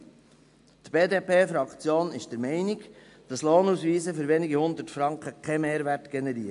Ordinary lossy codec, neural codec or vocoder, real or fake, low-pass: AAC, 64 kbps; none; real; 10.8 kHz